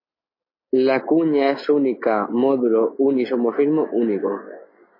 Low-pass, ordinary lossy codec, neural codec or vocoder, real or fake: 5.4 kHz; MP3, 24 kbps; none; real